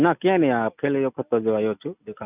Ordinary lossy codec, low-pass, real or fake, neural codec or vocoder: none; 3.6 kHz; real; none